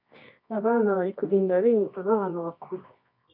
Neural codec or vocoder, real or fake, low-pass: codec, 24 kHz, 0.9 kbps, WavTokenizer, medium music audio release; fake; 5.4 kHz